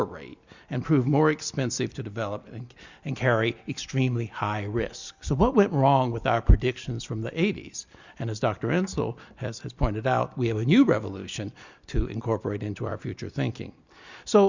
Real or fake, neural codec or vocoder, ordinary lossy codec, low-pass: real; none; Opus, 64 kbps; 7.2 kHz